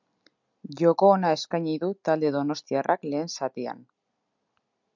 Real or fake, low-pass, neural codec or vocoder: real; 7.2 kHz; none